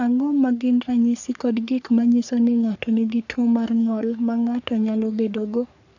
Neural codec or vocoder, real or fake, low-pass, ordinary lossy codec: codec, 44.1 kHz, 3.4 kbps, Pupu-Codec; fake; 7.2 kHz; none